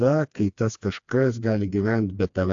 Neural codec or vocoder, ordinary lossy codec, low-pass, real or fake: codec, 16 kHz, 2 kbps, FreqCodec, smaller model; AAC, 64 kbps; 7.2 kHz; fake